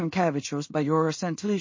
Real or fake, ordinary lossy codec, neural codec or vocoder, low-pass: fake; MP3, 32 kbps; codec, 16 kHz in and 24 kHz out, 0.4 kbps, LongCat-Audio-Codec, two codebook decoder; 7.2 kHz